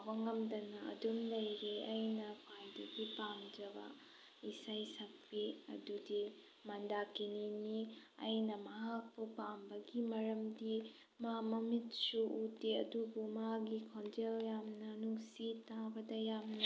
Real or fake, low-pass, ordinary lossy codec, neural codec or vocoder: real; none; none; none